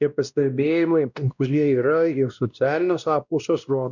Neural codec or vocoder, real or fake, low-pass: codec, 16 kHz, 1 kbps, X-Codec, WavLM features, trained on Multilingual LibriSpeech; fake; 7.2 kHz